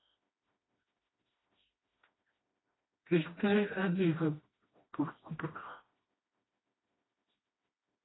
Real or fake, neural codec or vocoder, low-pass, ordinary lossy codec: fake; codec, 16 kHz, 1 kbps, FreqCodec, smaller model; 7.2 kHz; AAC, 16 kbps